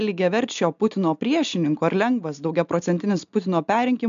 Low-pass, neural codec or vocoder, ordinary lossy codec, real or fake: 7.2 kHz; none; MP3, 64 kbps; real